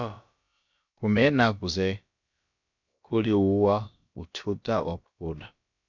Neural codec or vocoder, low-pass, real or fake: codec, 16 kHz, about 1 kbps, DyCAST, with the encoder's durations; 7.2 kHz; fake